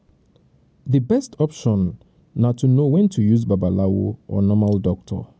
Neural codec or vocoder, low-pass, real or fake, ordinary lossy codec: none; none; real; none